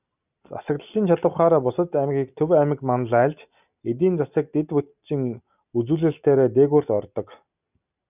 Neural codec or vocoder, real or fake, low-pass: none; real; 3.6 kHz